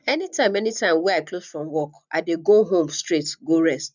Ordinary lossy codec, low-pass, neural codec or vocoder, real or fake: none; 7.2 kHz; none; real